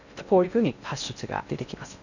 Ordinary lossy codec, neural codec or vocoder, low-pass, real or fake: none; codec, 16 kHz in and 24 kHz out, 0.6 kbps, FocalCodec, streaming, 2048 codes; 7.2 kHz; fake